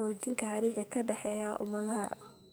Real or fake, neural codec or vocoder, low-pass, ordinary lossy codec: fake; codec, 44.1 kHz, 2.6 kbps, SNAC; none; none